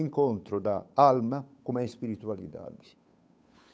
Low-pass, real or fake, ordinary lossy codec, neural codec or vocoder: none; fake; none; codec, 16 kHz, 8 kbps, FunCodec, trained on Chinese and English, 25 frames a second